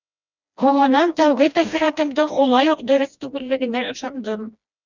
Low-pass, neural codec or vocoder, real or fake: 7.2 kHz; codec, 16 kHz, 1 kbps, FreqCodec, smaller model; fake